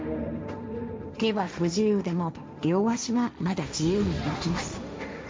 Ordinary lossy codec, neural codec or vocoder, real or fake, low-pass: none; codec, 16 kHz, 1.1 kbps, Voila-Tokenizer; fake; none